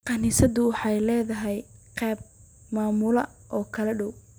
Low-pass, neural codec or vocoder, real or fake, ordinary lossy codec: none; none; real; none